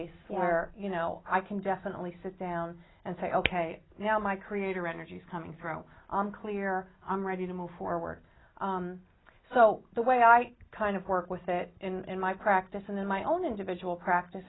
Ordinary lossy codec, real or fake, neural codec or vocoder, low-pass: AAC, 16 kbps; real; none; 7.2 kHz